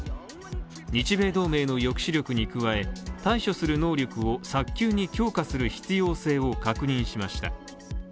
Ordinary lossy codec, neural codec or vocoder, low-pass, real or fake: none; none; none; real